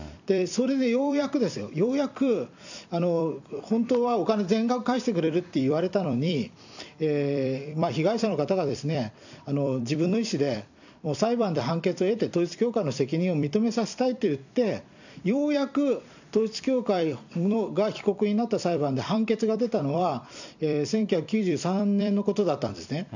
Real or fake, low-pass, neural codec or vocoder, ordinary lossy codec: fake; 7.2 kHz; vocoder, 44.1 kHz, 128 mel bands every 256 samples, BigVGAN v2; none